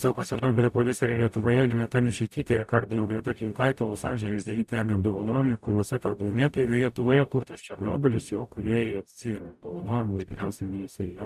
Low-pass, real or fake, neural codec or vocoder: 14.4 kHz; fake; codec, 44.1 kHz, 0.9 kbps, DAC